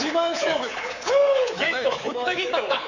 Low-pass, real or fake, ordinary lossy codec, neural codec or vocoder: 7.2 kHz; fake; none; codec, 24 kHz, 3.1 kbps, DualCodec